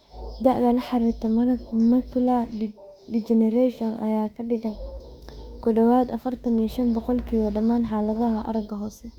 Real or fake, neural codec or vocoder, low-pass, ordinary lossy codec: fake; autoencoder, 48 kHz, 32 numbers a frame, DAC-VAE, trained on Japanese speech; 19.8 kHz; Opus, 64 kbps